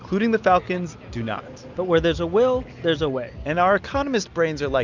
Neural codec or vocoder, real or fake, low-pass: none; real; 7.2 kHz